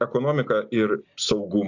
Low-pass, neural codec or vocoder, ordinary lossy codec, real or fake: 7.2 kHz; none; AAC, 48 kbps; real